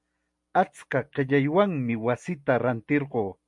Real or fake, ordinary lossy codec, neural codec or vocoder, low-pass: real; MP3, 64 kbps; none; 10.8 kHz